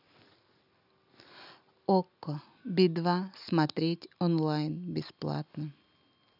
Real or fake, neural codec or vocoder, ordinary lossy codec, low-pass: real; none; none; 5.4 kHz